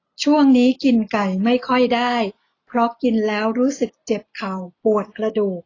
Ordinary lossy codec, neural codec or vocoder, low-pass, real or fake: AAC, 32 kbps; none; 7.2 kHz; real